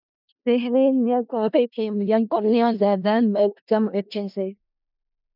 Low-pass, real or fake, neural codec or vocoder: 5.4 kHz; fake; codec, 16 kHz in and 24 kHz out, 0.4 kbps, LongCat-Audio-Codec, four codebook decoder